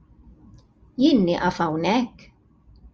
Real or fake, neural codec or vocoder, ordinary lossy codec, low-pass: real; none; Opus, 24 kbps; 7.2 kHz